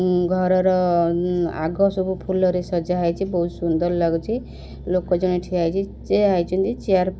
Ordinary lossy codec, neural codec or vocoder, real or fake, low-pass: none; none; real; none